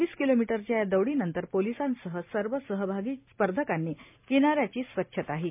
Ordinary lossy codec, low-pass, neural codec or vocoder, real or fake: MP3, 32 kbps; 3.6 kHz; none; real